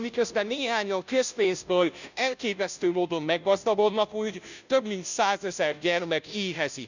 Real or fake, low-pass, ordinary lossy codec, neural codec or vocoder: fake; 7.2 kHz; none; codec, 16 kHz, 0.5 kbps, FunCodec, trained on Chinese and English, 25 frames a second